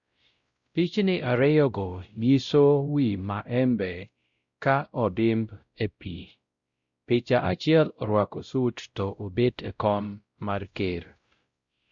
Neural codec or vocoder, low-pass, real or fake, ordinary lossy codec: codec, 16 kHz, 0.5 kbps, X-Codec, WavLM features, trained on Multilingual LibriSpeech; 7.2 kHz; fake; Opus, 64 kbps